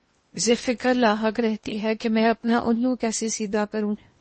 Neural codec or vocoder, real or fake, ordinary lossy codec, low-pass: codec, 16 kHz in and 24 kHz out, 0.8 kbps, FocalCodec, streaming, 65536 codes; fake; MP3, 32 kbps; 10.8 kHz